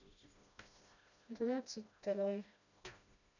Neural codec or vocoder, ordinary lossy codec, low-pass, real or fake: codec, 16 kHz, 1 kbps, FreqCodec, smaller model; none; 7.2 kHz; fake